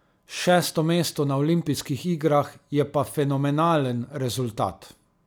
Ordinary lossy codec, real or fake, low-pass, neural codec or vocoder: none; real; none; none